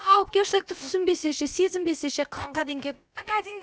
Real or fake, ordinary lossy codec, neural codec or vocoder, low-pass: fake; none; codec, 16 kHz, about 1 kbps, DyCAST, with the encoder's durations; none